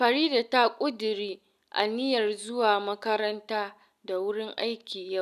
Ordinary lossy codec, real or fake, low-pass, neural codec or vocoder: none; real; 14.4 kHz; none